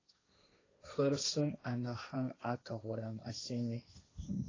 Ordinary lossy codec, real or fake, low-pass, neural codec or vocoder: AAC, 32 kbps; fake; 7.2 kHz; codec, 16 kHz, 1.1 kbps, Voila-Tokenizer